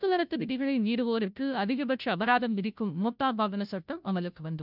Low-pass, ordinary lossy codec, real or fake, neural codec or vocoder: 5.4 kHz; none; fake; codec, 16 kHz, 0.5 kbps, FunCodec, trained on Chinese and English, 25 frames a second